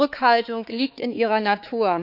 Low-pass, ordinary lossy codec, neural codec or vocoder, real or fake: 5.4 kHz; none; codec, 16 kHz, 4 kbps, X-Codec, HuBERT features, trained on balanced general audio; fake